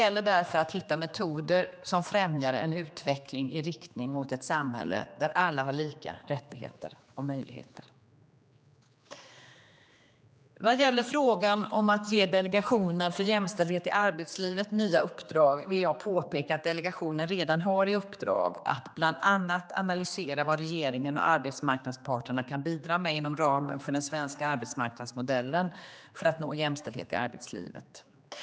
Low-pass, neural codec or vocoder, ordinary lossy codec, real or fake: none; codec, 16 kHz, 2 kbps, X-Codec, HuBERT features, trained on general audio; none; fake